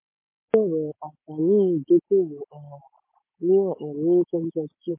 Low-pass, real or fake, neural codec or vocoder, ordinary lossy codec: 3.6 kHz; real; none; MP3, 24 kbps